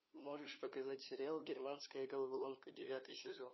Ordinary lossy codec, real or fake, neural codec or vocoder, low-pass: MP3, 24 kbps; fake; codec, 16 kHz, 2 kbps, FunCodec, trained on LibriTTS, 25 frames a second; 7.2 kHz